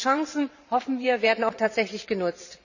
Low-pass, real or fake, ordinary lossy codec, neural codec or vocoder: 7.2 kHz; fake; none; vocoder, 44.1 kHz, 128 mel bands every 512 samples, BigVGAN v2